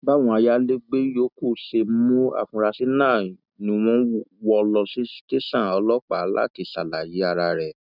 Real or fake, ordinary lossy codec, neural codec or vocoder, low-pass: real; none; none; 5.4 kHz